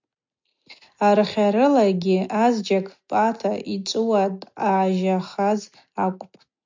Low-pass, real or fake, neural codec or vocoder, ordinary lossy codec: 7.2 kHz; real; none; MP3, 64 kbps